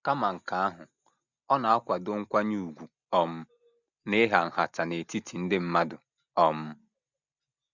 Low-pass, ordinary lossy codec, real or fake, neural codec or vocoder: 7.2 kHz; none; real; none